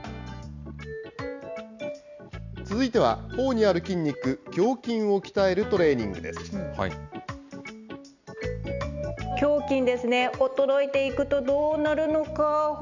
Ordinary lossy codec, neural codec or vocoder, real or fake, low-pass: none; none; real; 7.2 kHz